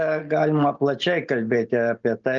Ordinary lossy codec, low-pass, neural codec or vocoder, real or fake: Opus, 24 kbps; 7.2 kHz; none; real